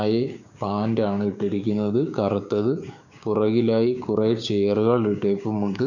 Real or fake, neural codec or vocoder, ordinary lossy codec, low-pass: fake; codec, 16 kHz, 6 kbps, DAC; none; 7.2 kHz